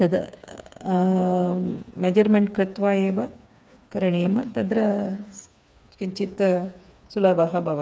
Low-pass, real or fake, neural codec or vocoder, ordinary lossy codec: none; fake; codec, 16 kHz, 4 kbps, FreqCodec, smaller model; none